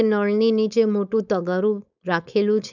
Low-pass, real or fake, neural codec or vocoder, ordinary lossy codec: 7.2 kHz; fake; codec, 16 kHz, 4.8 kbps, FACodec; none